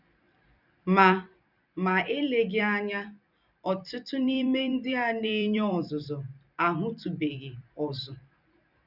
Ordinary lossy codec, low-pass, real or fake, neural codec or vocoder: none; 5.4 kHz; fake; vocoder, 44.1 kHz, 128 mel bands every 256 samples, BigVGAN v2